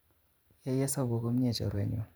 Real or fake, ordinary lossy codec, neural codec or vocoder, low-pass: fake; none; vocoder, 44.1 kHz, 128 mel bands every 512 samples, BigVGAN v2; none